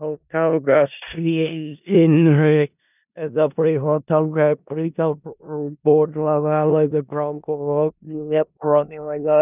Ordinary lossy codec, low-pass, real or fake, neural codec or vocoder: none; 3.6 kHz; fake; codec, 16 kHz in and 24 kHz out, 0.4 kbps, LongCat-Audio-Codec, four codebook decoder